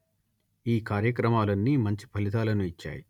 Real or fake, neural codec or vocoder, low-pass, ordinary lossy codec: real; none; 19.8 kHz; none